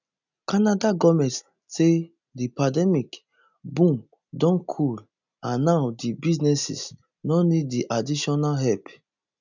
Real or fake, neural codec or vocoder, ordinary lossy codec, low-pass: real; none; none; 7.2 kHz